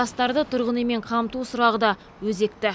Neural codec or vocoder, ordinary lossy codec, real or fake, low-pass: none; none; real; none